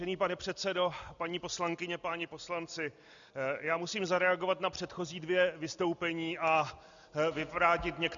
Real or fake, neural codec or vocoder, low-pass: real; none; 7.2 kHz